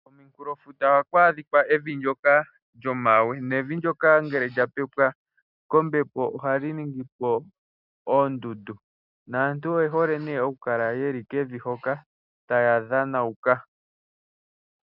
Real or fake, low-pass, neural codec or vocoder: real; 5.4 kHz; none